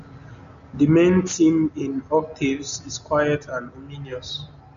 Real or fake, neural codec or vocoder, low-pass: real; none; 7.2 kHz